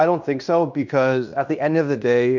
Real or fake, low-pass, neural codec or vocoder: fake; 7.2 kHz; codec, 16 kHz in and 24 kHz out, 0.9 kbps, LongCat-Audio-Codec, fine tuned four codebook decoder